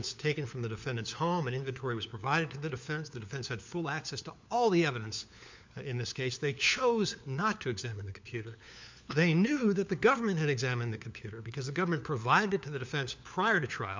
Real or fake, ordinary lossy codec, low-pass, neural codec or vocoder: fake; MP3, 64 kbps; 7.2 kHz; codec, 16 kHz, 4 kbps, FunCodec, trained on LibriTTS, 50 frames a second